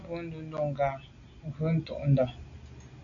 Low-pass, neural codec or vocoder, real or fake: 7.2 kHz; none; real